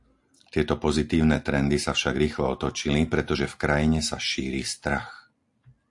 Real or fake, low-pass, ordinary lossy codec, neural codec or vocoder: real; 10.8 kHz; AAC, 64 kbps; none